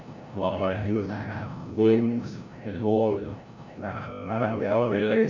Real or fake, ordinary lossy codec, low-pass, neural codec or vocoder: fake; none; 7.2 kHz; codec, 16 kHz, 0.5 kbps, FreqCodec, larger model